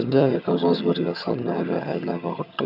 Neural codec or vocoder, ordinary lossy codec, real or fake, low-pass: vocoder, 22.05 kHz, 80 mel bands, HiFi-GAN; none; fake; 5.4 kHz